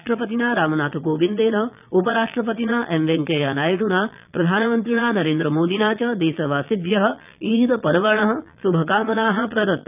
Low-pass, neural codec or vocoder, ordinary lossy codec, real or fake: 3.6 kHz; vocoder, 22.05 kHz, 80 mel bands, Vocos; none; fake